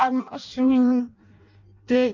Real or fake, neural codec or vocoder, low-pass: fake; codec, 16 kHz in and 24 kHz out, 0.6 kbps, FireRedTTS-2 codec; 7.2 kHz